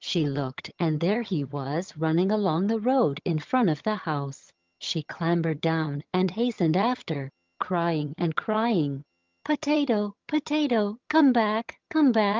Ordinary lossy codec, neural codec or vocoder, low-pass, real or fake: Opus, 24 kbps; vocoder, 22.05 kHz, 80 mel bands, HiFi-GAN; 7.2 kHz; fake